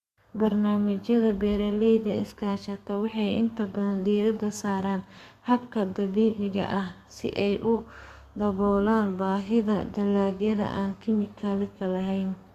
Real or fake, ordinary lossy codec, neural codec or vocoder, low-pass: fake; Opus, 64 kbps; codec, 32 kHz, 1.9 kbps, SNAC; 14.4 kHz